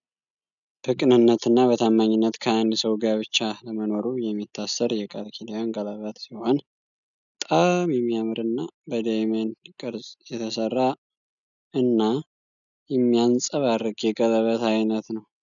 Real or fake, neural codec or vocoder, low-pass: real; none; 7.2 kHz